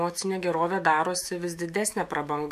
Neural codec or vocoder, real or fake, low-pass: autoencoder, 48 kHz, 128 numbers a frame, DAC-VAE, trained on Japanese speech; fake; 14.4 kHz